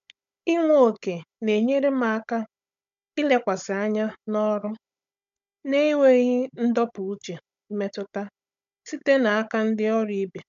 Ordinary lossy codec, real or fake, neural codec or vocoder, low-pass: MP3, 64 kbps; fake; codec, 16 kHz, 16 kbps, FunCodec, trained on Chinese and English, 50 frames a second; 7.2 kHz